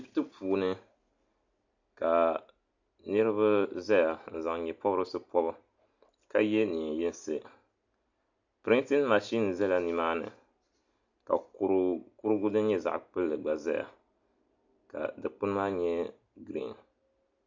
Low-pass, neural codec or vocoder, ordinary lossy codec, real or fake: 7.2 kHz; none; AAC, 48 kbps; real